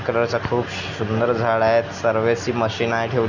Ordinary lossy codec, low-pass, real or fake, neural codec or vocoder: none; 7.2 kHz; real; none